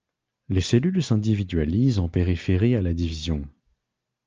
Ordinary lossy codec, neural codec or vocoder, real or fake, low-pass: Opus, 24 kbps; none; real; 7.2 kHz